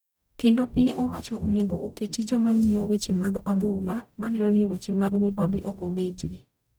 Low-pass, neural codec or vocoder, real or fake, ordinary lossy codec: none; codec, 44.1 kHz, 0.9 kbps, DAC; fake; none